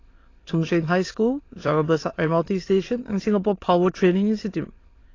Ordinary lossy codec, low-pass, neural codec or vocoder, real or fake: AAC, 32 kbps; 7.2 kHz; autoencoder, 22.05 kHz, a latent of 192 numbers a frame, VITS, trained on many speakers; fake